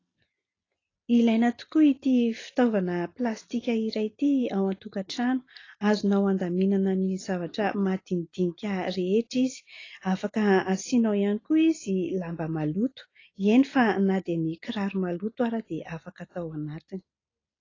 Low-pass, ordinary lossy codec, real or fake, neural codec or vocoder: 7.2 kHz; AAC, 32 kbps; real; none